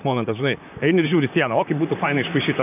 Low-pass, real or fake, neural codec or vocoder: 3.6 kHz; fake; vocoder, 44.1 kHz, 128 mel bands, Pupu-Vocoder